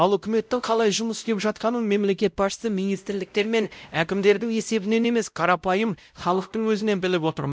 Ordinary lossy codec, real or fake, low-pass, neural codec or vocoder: none; fake; none; codec, 16 kHz, 0.5 kbps, X-Codec, WavLM features, trained on Multilingual LibriSpeech